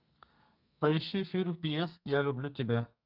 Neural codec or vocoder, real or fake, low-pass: codec, 32 kHz, 1.9 kbps, SNAC; fake; 5.4 kHz